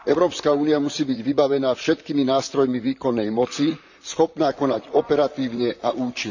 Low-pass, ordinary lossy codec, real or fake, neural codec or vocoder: 7.2 kHz; none; fake; vocoder, 44.1 kHz, 128 mel bands, Pupu-Vocoder